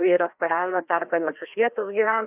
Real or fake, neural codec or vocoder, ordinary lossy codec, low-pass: fake; codec, 16 kHz, 1 kbps, FreqCodec, larger model; AAC, 32 kbps; 3.6 kHz